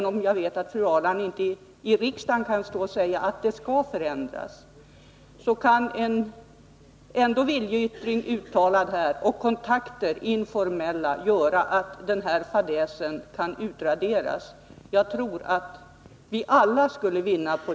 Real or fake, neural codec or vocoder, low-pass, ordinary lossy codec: real; none; none; none